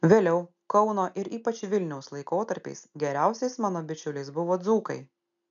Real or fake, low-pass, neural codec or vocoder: real; 7.2 kHz; none